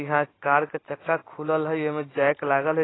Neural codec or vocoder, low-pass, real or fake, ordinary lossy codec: none; 7.2 kHz; real; AAC, 16 kbps